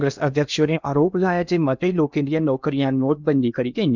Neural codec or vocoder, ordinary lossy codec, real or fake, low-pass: codec, 16 kHz in and 24 kHz out, 0.8 kbps, FocalCodec, streaming, 65536 codes; none; fake; 7.2 kHz